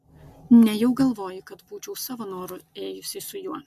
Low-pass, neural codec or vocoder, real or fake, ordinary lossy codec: 14.4 kHz; none; real; AAC, 96 kbps